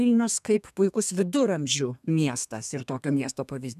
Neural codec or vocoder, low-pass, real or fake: codec, 32 kHz, 1.9 kbps, SNAC; 14.4 kHz; fake